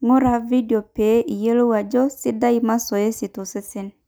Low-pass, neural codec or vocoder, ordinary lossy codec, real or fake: none; none; none; real